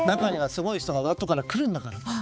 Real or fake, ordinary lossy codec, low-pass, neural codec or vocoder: fake; none; none; codec, 16 kHz, 4 kbps, X-Codec, HuBERT features, trained on balanced general audio